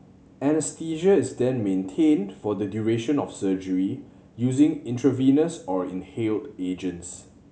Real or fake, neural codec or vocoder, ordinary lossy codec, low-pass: real; none; none; none